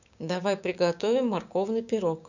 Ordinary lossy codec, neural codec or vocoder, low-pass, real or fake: none; codec, 16 kHz, 6 kbps, DAC; 7.2 kHz; fake